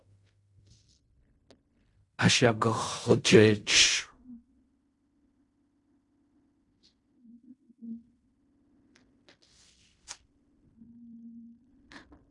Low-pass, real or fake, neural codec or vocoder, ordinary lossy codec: 10.8 kHz; fake; codec, 16 kHz in and 24 kHz out, 0.4 kbps, LongCat-Audio-Codec, fine tuned four codebook decoder; AAC, 64 kbps